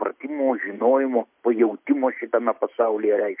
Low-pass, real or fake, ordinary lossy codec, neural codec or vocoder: 3.6 kHz; real; MP3, 32 kbps; none